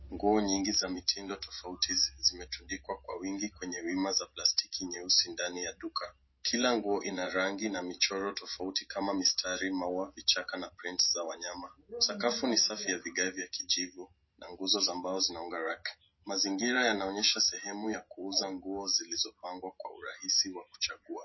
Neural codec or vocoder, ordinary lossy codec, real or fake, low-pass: none; MP3, 24 kbps; real; 7.2 kHz